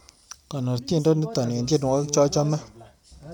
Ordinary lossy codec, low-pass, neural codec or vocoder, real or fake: none; 19.8 kHz; none; real